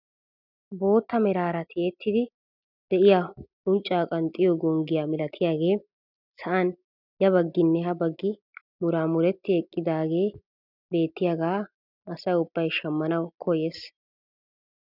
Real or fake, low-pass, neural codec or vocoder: real; 5.4 kHz; none